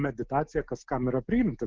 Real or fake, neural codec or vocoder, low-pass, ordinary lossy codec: real; none; 7.2 kHz; Opus, 16 kbps